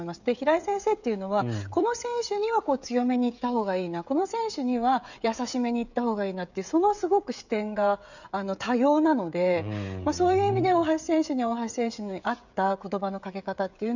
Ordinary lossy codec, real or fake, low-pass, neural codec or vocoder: none; fake; 7.2 kHz; codec, 16 kHz, 16 kbps, FreqCodec, smaller model